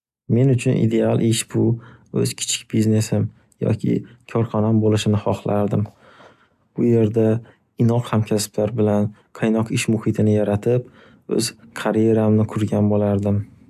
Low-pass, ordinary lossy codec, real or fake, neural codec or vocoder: 14.4 kHz; AAC, 96 kbps; real; none